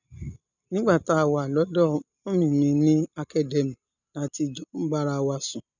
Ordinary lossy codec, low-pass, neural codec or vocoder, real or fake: none; 7.2 kHz; none; real